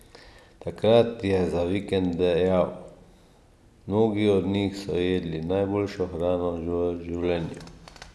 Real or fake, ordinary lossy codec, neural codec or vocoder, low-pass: real; none; none; none